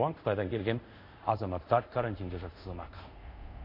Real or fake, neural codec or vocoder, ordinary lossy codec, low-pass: fake; codec, 24 kHz, 0.5 kbps, DualCodec; MP3, 32 kbps; 5.4 kHz